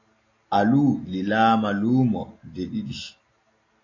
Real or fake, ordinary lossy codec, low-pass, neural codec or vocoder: real; AAC, 32 kbps; 7.2 kHz; none